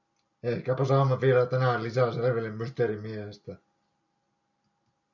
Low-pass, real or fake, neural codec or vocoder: 7.2 kHz; real; none